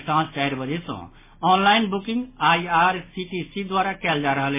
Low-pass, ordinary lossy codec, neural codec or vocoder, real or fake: 3.6 kHz; MP3, 16 kbps; none; real